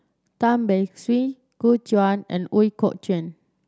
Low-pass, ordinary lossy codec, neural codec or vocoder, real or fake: none; none; none; real